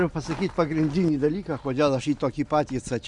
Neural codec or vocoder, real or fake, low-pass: none; real; 10.8 kHz